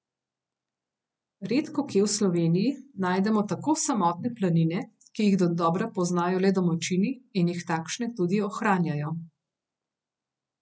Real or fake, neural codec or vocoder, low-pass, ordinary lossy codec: real; none; none; none